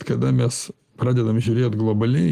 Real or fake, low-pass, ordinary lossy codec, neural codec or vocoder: real; 14.4 kHz; Opus, 24 kbps; none